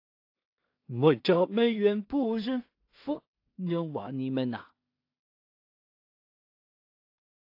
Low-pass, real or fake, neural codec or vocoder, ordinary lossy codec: 5.4 kHz; fake; codec, 16 kHz in and 24 kHz out, 0.4 kbps, LongCat-Audio-Codec, two codebook decoder; AAC, 32 kbps